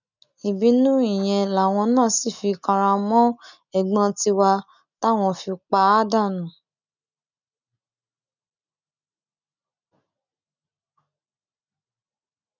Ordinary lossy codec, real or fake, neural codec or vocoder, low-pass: none; real; none; 7.2 kHz